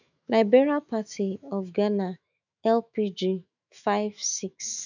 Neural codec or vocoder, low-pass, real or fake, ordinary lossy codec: autoencoder, 48 kHz, 128 numbers a frame, DAC-VAE, trained on Japanese speech; 7.2 kHz; fake; none